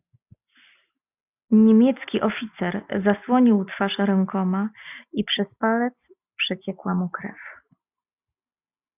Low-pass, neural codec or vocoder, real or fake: 3.6 kHz; none; real